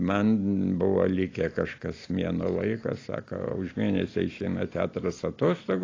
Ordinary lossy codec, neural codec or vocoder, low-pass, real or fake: AAC, 32 kbps; none; 7.2 kHz; real